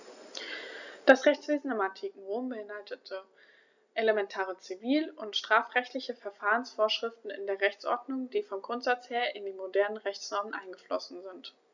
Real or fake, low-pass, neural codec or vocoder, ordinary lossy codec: real; 7.2 kHz; none; none